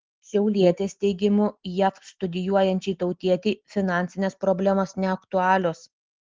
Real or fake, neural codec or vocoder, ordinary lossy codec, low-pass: real; none; Opus, 16 kbps; 7.2 kHz